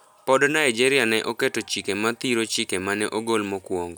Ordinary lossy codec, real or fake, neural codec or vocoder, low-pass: none; real; none; none